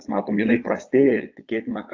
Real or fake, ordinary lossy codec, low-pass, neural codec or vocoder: fake; AAC, 48 kbps; 7.2 kHz; vocoder, 22.05 kHz, 80 mel bands, Vocos